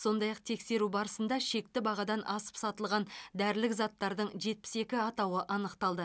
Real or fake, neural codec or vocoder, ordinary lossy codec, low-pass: real; none; none; none